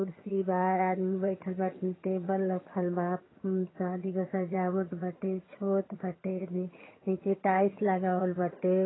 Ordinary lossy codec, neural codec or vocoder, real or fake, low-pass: AAC, 16 kbps; vocoder, 22.05 kHz, 80 mel bands, HiFi-GAN; fake; 7.2 kHz